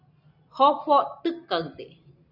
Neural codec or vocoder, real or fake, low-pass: none; real; 5.4 kHz